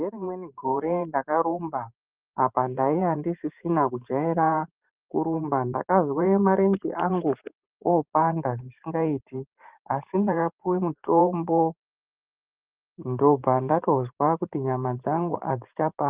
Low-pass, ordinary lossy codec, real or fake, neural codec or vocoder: 3.6 kHz; Opus, 24 kbps; fake; vocoder, 44.1 kHz, 128 mel bands every 512 samples, BigVGAN v2